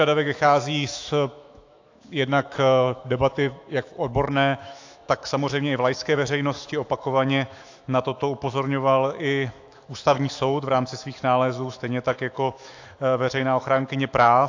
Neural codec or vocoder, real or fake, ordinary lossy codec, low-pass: autoencoder, 48 kHz, 128 numbers a frame, DAC-VAE, trained on Japanese speech; fake; AAC, 48 kbps; 7.2 kHz